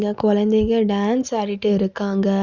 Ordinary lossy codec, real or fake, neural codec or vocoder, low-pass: Opus, 64 kbps; real; none; 7.2 kHz